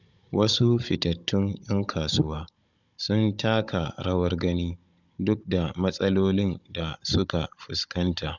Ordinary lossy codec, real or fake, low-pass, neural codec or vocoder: none; fake; 7.2 kHz; codec, 16 kHz, 16 kbps, FunCodec, trained on Chinese and English, 50 frames a second